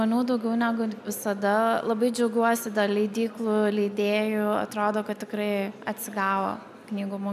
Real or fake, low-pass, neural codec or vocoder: real; 14.4 kHz; none